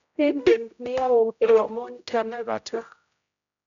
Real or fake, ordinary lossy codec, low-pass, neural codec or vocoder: fake; none; 7.2 kHz; codec, 16 kHz, 0.5 kbps, X-Codec, HuBERT features, trained on general audio